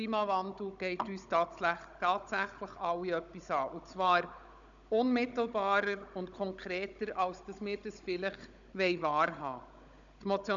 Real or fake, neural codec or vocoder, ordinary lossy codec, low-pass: fake; codec, 16 kHz, 16 kbps, FunCodec, trained on Chinese and English, 50 frames a second; none; 7.2 kHz